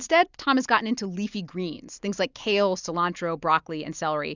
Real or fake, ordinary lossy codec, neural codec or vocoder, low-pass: real; Opus, 64 kbps; none; 7.2 kHz